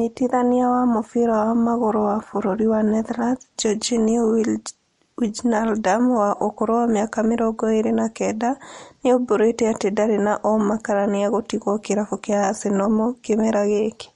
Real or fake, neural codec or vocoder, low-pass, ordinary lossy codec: real; none; 19.8 kHz; MP3, 48 kbps